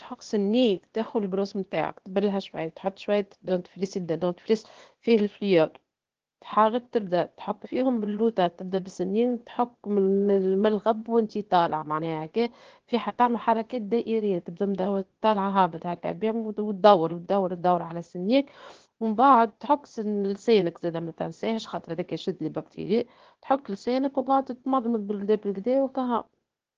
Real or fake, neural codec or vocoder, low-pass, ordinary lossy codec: fake; codec, 16 kHz, 0.8 kbps, ZipCodec; 7.2 kHz; Opus, 16 kbps